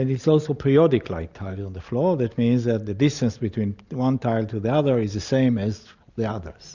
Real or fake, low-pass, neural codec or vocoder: real; 7.2 kHz; none